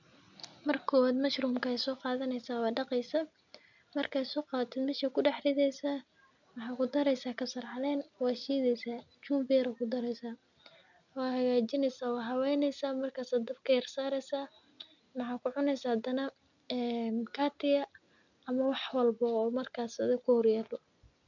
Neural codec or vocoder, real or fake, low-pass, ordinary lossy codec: none; real; 7.2 kHz; none